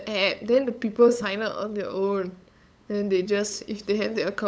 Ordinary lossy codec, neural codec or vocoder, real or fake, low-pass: none; codec, 16 kHz, 8 kbps, FunCodec, trained on LibriTTS, 25 frames a second; fake; none